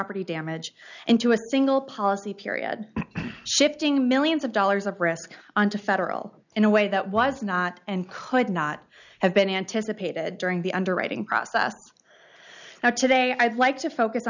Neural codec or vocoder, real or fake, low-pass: none; real; 7.2 kHz